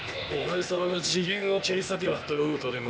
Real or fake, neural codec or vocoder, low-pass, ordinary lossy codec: fake; codec, 16 kHz, 0.8 kbps, ZipCodec; none; none